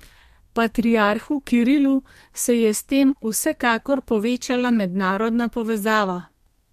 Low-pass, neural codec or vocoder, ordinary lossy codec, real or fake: 14.4 kHz; codec, 32 kHz, 1.9 kbps, SNAC; MP3, 64 kbps; fake